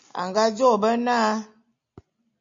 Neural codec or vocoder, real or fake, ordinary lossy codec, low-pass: none; real; MP3, 48 kbps; 7.2 kHz